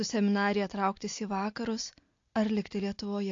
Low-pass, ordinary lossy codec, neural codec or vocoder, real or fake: 7.2 kHz; AAC, 48 kbps; none; real